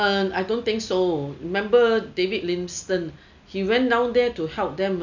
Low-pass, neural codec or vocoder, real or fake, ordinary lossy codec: 7.2 kHz; none; real; none